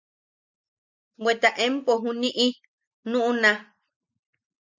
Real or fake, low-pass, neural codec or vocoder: real; 7.2 kHz; none